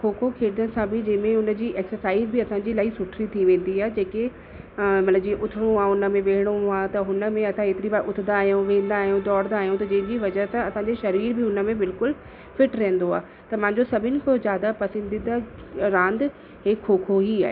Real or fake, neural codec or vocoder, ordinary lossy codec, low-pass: real; none; none; 5.4 kHz